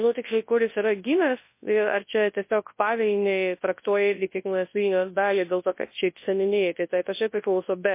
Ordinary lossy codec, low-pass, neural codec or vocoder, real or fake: MP3, 24 kbps; 3.6 kHz; codec, 24 kHz, 0.9 kbps, WavTokenizer, large speech release; fake